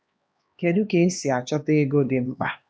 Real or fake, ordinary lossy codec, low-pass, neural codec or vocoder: fake; none; none; codec, 16 kHz, 2 kbps, X-Codec, HuBERT features, trained on LibriSpeech